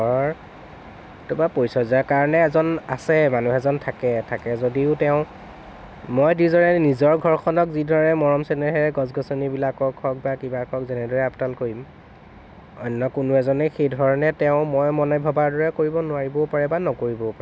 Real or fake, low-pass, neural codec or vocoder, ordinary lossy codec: real; none; none; none